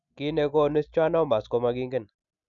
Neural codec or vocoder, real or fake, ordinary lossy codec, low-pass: none; real; none; 7.2 kHz